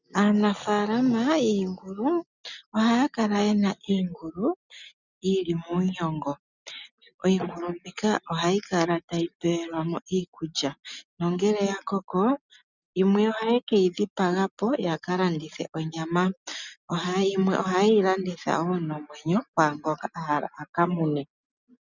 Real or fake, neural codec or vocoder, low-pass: real; none; 7.2 kHz